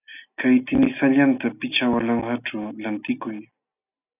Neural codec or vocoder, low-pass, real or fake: none; 3.6 kHz; real